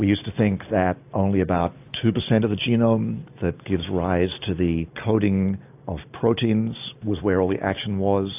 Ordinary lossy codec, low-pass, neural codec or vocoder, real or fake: AAC, 32 kbps; 3.6 kHz; none; real